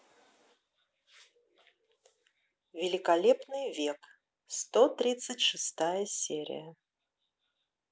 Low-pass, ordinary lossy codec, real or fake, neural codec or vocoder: none; none; real; none